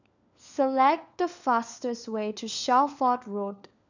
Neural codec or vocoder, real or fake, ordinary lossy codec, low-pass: codec, 16 kHz, 4 kbps, FunCodec, trained on LibriTTS, 50 frames a second; fake; none; 7.2 kHz